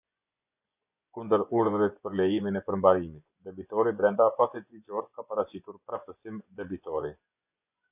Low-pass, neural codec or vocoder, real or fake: 3.6 kHz; vocoder, 24 kHz, 100 mel bands, Vocos; fake